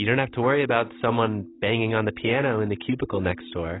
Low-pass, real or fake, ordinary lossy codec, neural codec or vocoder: 7.2 kHz; real; AAC, 16 kbps; none